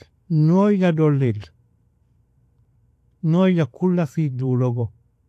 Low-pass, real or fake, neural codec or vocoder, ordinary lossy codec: 14.4 kHz; fake; vocoder, 44.1 kHz, 128 mel bands every 512 samples, BigVGAN v2; AAC, 64 kbps